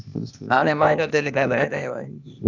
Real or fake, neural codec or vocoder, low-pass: fake; codec, 16 kHz, 0.8 kbps, ZipCodec; 7.2 kHz